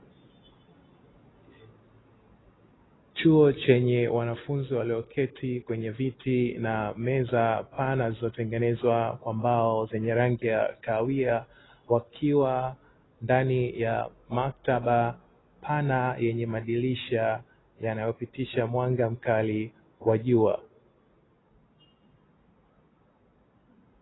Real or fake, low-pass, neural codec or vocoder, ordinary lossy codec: real; 7.2 kHz; none; AAC, 16 kbps